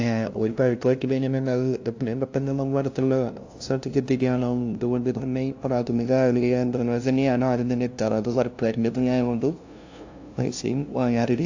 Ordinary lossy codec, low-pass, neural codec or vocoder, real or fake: AAC, 48 kbps; 7.2 kHz; codec, 16 kHz, 0.5 kbps, FunCodec, trained on LibriTTS, 25 frames a second; fake